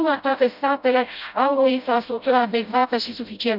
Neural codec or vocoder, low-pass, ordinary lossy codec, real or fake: codec, 16 kHz, 0.5 kbps, FreqCodec, smaller model; 5.4 kHz; none; fake